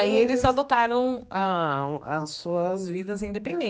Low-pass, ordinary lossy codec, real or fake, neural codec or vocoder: none; none; fake; codec, 16 kHz, 2 kbps, X-Codec, HuBERT features, trained on general audio